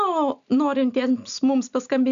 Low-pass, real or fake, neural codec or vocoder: 7.2 kHz; real; none